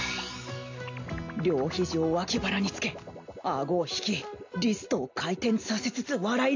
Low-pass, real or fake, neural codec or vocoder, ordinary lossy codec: 7.2 kHz; real; none; AAC, 48 kbps